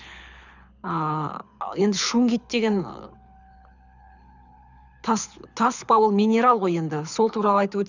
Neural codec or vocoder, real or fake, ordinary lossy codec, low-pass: codec, 24 kHz, 6 kbps, HILCodec; fake; none; 7.2 kHz